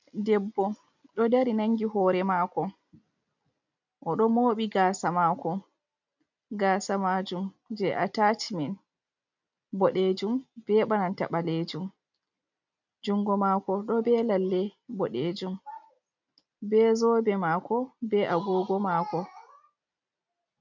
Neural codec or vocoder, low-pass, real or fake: none; 7.2 kHz; real